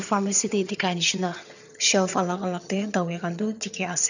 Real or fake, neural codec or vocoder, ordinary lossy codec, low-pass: fake; vocoder, 22.05 kHz, 80 mel bands, HiFi-GAN; none; 7.2 kHz